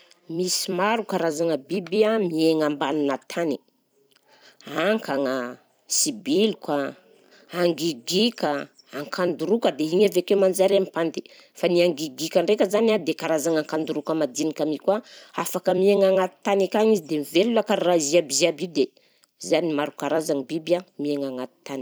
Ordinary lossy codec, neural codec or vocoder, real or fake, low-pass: none; vocoder, 44.1 kHz, 128 mel bands every 256 samples, BigVGAN v2; fake; none